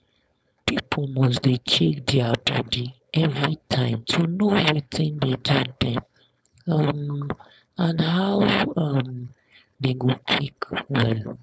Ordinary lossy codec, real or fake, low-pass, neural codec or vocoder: none; fake; none; codec, 16 kHz, 4.8 kbps, FACodec